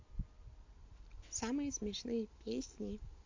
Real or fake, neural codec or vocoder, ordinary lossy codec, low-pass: real; none; MP3, 48 kbps; 7.2 kHz